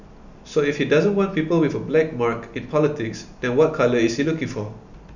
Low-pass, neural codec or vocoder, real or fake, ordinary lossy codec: 7.2 kHz; none; real; none